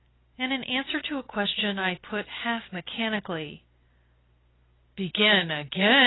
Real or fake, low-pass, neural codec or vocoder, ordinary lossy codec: real; 7.2 kHz; none; AAC, 16 kbps